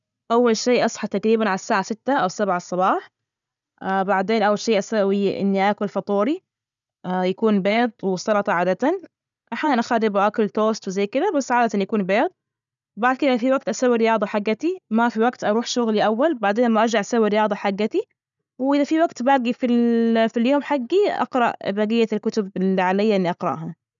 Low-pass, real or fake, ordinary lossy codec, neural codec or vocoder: 7.2 kHz; real; MP3, 96 kbps; none